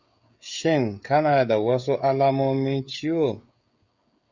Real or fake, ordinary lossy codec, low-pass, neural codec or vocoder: fake; Opus, 64 kbps; 7.2 kHz; codec, 16 kHz, 16 kbps, FreqCodec, smaller model